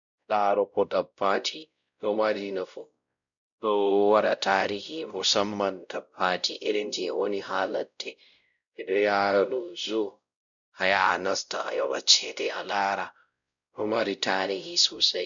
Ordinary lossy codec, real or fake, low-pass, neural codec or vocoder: none; fake; 7.2 kHz; codec, 16 kHz, 0.5 kbps, X-Codec, WavLM features, trained on Multilingual LibriSpeech